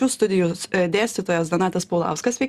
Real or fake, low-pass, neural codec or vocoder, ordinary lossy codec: real; 14.4 kHz; none; Opus, 64 kbps